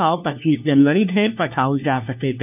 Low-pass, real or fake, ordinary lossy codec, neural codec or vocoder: 3.6 kHz; fake; none; codec, 16 kHz, 1 kbps, FunCodec, trained on LibriTTS, 50 frames a second